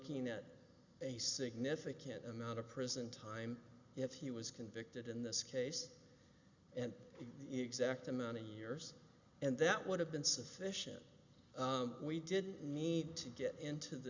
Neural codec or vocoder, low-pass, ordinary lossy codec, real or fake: none; 7.2 kHz; Opus, 64 kbps; real